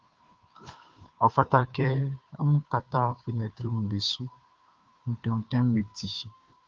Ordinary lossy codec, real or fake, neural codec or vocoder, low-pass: Opus, 24 kbps; fake; codec, 16 kHz, 2 kbps, FunCodec, trained on Chinese and English, 25 frames a second; 7.2 kHz